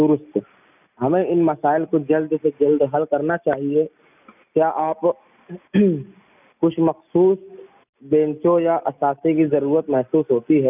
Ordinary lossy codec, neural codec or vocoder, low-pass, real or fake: none; none; 3.6 kHz; real